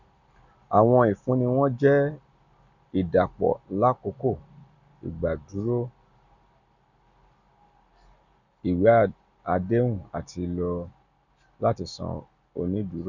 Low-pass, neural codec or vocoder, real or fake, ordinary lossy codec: 7.2 kHz; none; real; none